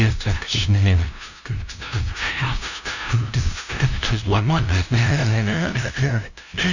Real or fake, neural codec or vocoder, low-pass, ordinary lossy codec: fake; codec, 16 kHz, 0.5 kbps, FunCodec, trained on LibriTTS, 25 frames a second; 7.2 kHz; none